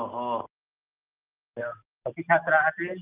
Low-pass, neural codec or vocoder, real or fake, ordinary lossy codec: 3.6 kHz; none; real; Opus, 24 kbps